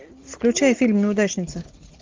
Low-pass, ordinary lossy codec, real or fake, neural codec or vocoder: 7.2 kHz; Opus, 32 kbps; real; none